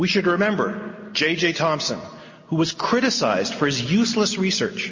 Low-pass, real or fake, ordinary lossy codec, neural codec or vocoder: 7.2 kHz; real; MP3, 32 kbps; none